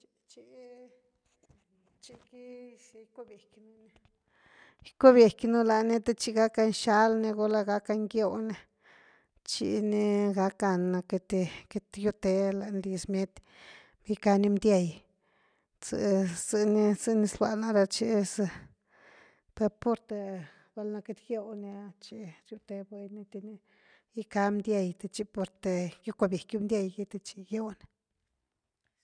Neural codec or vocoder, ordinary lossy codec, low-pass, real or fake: none; none; 9.9 kHz; real